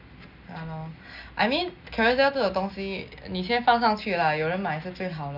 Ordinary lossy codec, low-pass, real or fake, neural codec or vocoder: none; 5.4 kHz; real; none